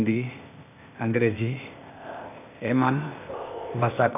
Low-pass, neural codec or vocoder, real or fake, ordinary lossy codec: 3.6 kHz; codec, 16 kHz, 0.8 kbps, ZipCodec; fake; none